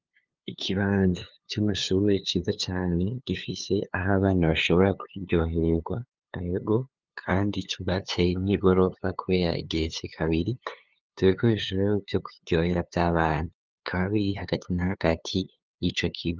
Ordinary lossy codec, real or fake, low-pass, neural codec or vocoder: Opus, 32 kbps; fake; 7.2 kHz; codec, 16 kHz, 2 kbps, FunCodec, trained on LibriTTS, 25 frames a second